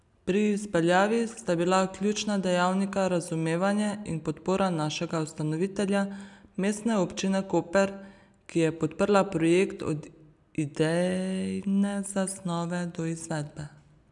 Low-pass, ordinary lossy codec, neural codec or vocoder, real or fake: 10.8 kHz; none; none; real